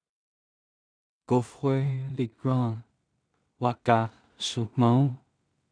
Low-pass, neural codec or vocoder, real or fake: 9.9 kHz; codec, 16 kHz in and 24 kHz out, 0.4 kbps, LongCat-Audio-Codec, two codebook decoder; fake